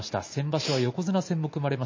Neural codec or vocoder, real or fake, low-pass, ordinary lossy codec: none; real; 7.2 kHz; MP3, 32 kbps